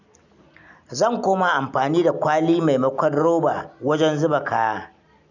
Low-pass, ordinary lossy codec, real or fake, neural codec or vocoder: 7.2 kHz; none; real; none